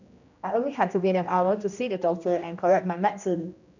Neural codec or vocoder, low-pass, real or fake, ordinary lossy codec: codec, 16 kHz, 1 kbps, X-Codec, HuBERT features, trained on general audio; 7.2 kHz; fake; none